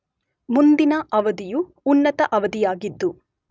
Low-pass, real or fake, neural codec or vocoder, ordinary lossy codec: none; real; none; none